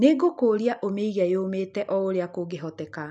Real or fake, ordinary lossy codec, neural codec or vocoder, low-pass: real; none; none; none